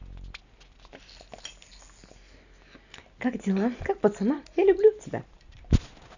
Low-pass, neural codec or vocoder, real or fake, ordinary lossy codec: 7.2 kHz; none; real; none